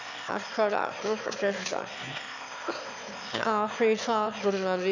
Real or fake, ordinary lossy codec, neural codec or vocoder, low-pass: fake; none; autoencoder, 22.05 kHz, a latent of 192 numbers a frame, VITS, trained on one speaker; 7.2 kHz